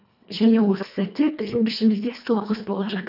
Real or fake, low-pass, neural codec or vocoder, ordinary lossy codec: fake; 5.4 kHz; codec, 24 kHz, 1.5 kbps, HILCodec; none